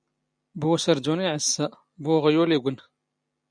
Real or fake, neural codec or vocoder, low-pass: real; none; 9.9 kHz